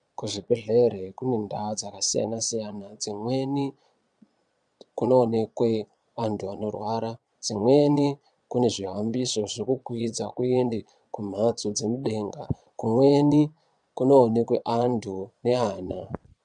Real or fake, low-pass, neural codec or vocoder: fake; 9.9 kHz; vocoder, 22.05 kHz, 80 mel bands, Vocos